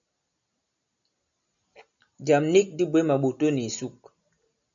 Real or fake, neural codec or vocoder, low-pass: real; none; 7.2 kHz